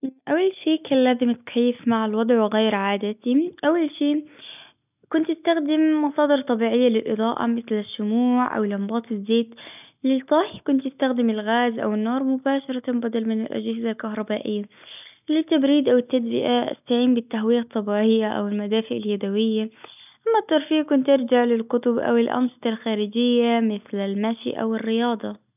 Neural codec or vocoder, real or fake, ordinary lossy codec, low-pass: none; real; none; 3.6 kHz